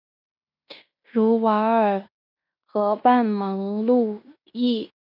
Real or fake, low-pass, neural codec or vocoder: fake; 5.4 kHz; codec, 16 kHz in and 24 kHz out, 0.9 kbps, LongCat-Audio-Codec, fine tuned four codebook decoder